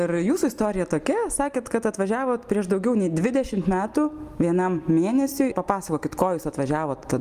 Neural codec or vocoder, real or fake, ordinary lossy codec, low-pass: none; real; Opus, 32 kbps; 14.4 kHz